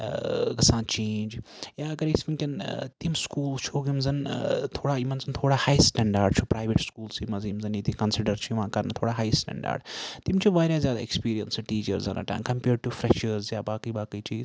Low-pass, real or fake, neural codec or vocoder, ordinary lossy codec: none; real; none; none